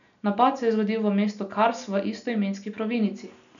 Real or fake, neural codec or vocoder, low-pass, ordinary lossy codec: real; none; 7.2 kHz; none